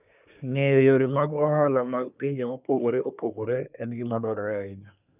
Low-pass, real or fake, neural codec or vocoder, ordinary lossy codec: 3.6 kHz; fake; codec, 24 kHz, 1 kbps, SNAC; none